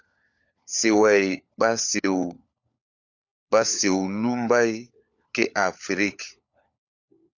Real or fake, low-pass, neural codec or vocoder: fake; 7.2 kHz; codec, 16 kHz, 4 kbps, FunCodec, trained on LibriTTS, 50 frames a second